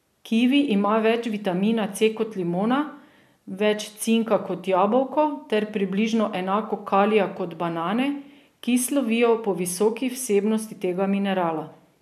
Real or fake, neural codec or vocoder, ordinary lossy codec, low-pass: real; none; MP3, 96 kbps; 14.4 kHz